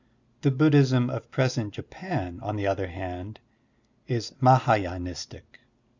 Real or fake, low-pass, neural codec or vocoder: real; 7.2 kHz; none